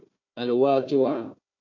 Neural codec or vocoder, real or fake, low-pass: codec, 16 kHz, 1 kbps, FunCodec, trained on Chinese and English, 50 frames a second; fake; 7.2 kHz